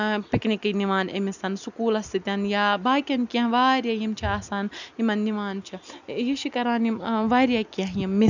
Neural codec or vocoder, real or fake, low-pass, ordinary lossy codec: none; real; 7.2 kHz; none